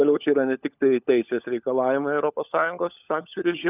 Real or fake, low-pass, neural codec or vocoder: fake; 3.6 kHz; codec, 16 kHz, 16 kbps, FunCodec, trained on LibriTTS, 50 frames a second